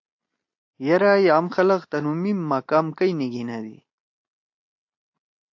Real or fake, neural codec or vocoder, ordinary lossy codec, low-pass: real; none; AAC, 48 kbps; 7.2 kHz